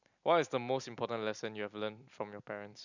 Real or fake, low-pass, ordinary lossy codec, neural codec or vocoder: real; 7.2 kHz; none; none